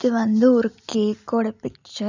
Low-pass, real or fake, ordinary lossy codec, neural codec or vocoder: 7.2 kHz; real; none; none